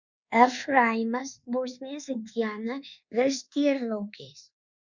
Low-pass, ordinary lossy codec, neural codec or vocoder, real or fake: 7.2 kHz; Opus, 64 kbps; codec, 24 kHz, 1.2 kbps, DualCodec; fake